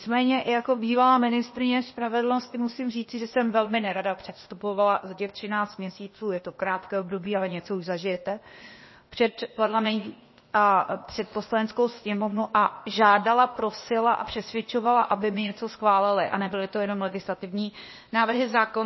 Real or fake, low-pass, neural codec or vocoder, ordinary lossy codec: fake; 7.2 kHz; codec, 16 kHz, 0.8 kbps, ZipCodec; MP3, 24 kbps